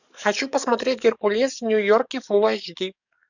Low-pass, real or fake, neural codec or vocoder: 7.2 kHz; fake; codec, 44.1 kHz, 7.8 kbps, DAC